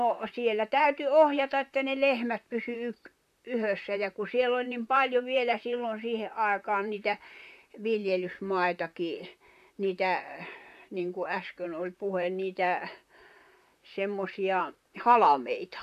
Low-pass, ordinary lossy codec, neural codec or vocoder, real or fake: 14.4 kHz; AAC, 96 kbps; vocoder, 44.1 kHz, 128 mel bands, Pupu-Vocoder; fake